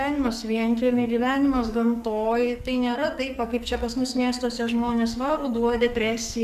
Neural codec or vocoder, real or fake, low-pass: codec, 44.1 kHz, 2.6 kbps, SNAC; fake; 14.4 kHz